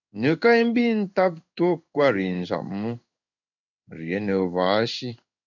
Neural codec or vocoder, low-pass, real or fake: codec, 16 kHz in and 24 kHz out, 1 kbps, XY-Tokenizer; 7.2 kHz; fake